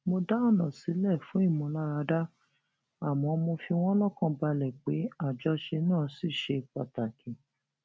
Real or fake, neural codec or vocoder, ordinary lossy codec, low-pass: real; none; none; none